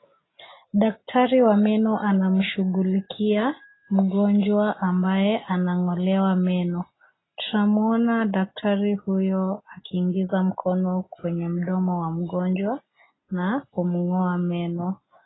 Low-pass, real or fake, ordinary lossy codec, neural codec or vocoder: 7.2 kHz; real; AAC, 16 kbps; none